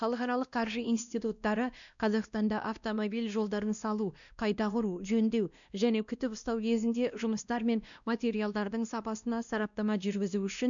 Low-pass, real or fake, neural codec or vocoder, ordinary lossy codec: 7.2 kHz; fake; codec, 16 kHz, 1 kbps, X-Codec, WavLM features, trained on Multilingual LibriSpeech; none